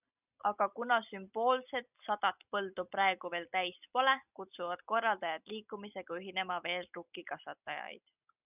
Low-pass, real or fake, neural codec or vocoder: 3.6 kHz; real; none